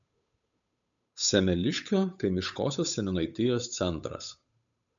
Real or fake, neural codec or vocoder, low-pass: fake; codec, 16 kHz, 8 kbps, FunCodec, trained on Chinese and English, 25 frames a second; 7.2 kHz